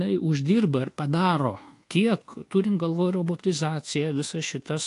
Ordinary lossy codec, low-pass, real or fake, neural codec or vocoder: AAC, 48 kbps; 10.8 kHz; fake; codec, 24 kHz, 1.2 kbps, DualCodec